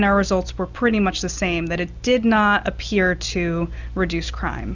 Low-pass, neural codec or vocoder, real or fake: 7.2 kHz; none; real